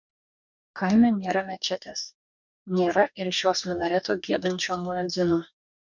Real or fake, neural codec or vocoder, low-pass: fake; codec, 44.1 kHz, 2.6 kbps, DAC; 7.2 kHz